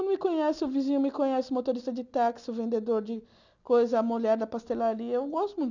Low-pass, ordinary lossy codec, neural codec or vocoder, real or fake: 7.2 kHz; none; none; real